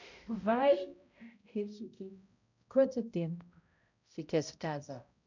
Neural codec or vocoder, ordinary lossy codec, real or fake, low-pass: codec, 16 kHz, 0.5 kbps, X-Codec, HuBERT features, trained on balanced general audio; none; fake; 7.2 kHz